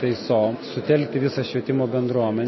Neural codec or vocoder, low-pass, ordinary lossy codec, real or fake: none; 7.2 kHz; MP3, 24 kbps; real